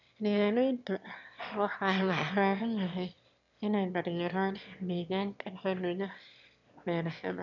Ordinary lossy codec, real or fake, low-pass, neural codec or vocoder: none; fake; 7.2 kHz; autoencoder, 22.05 kHz, a latent of 192 numbers a frame, VITS, trained on one speaker